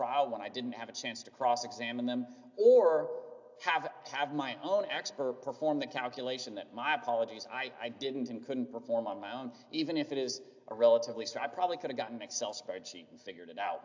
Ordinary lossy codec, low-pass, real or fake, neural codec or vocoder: AAC, 48 kbps; 7.2 kHz; real; none